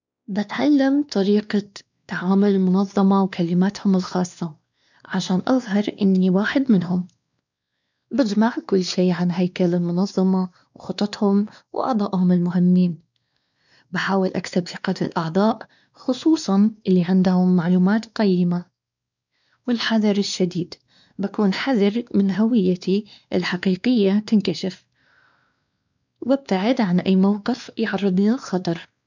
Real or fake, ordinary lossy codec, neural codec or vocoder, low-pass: fake; none; codec, 16 kHz, 2 kbps, X-Codec, WavLM features, trained on Multilingual LibriSpeech; 7.2 kHz